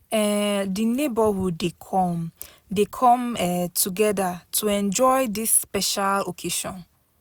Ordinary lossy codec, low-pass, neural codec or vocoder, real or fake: none; none; none; real